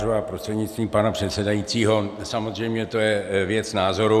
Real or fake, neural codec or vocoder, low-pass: real; none; 14.4 kHz